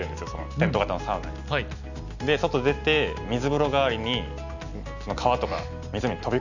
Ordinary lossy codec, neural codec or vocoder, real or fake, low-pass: none; none; real; 7.2 kHz